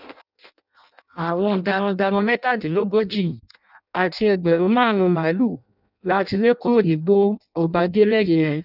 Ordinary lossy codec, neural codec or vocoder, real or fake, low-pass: none; codec, 16 kHz in and 24 kHz out, 0.6 kbps, FireRedTTS-2 codec; fake; 5.4 kHz